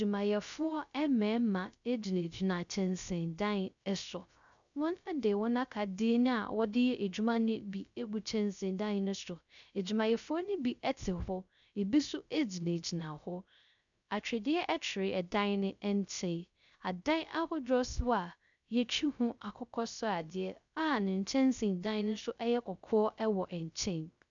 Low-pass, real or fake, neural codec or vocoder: 7.2 kHz; fake; codec, 16 kHz, 0.3 kbps, FocalCodec